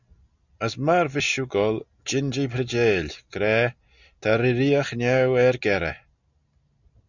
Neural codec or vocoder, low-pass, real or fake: none; 7.2 kHz; real